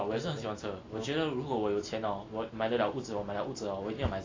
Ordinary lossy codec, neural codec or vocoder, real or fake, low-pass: AAC, 32 kbps; none; real; 7.2 kHz